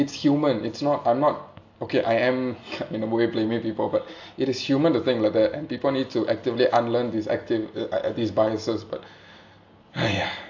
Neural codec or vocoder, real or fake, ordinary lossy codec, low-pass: none; real; AAC, 48 kbps; 7.2 kHz